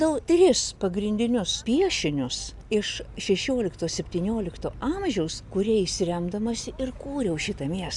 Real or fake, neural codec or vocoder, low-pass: real; none; 10.8 kHz